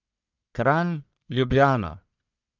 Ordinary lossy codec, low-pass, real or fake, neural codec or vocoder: none; 7.2 kHz; fake; codec, 44.1 kHz, 1.7 kbps, Pupu-Codec